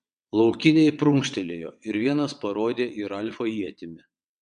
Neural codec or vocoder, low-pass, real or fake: vocoder, 22.05 kHz, 80 mel bands, WaveNeXt; 9.9 kHz; fake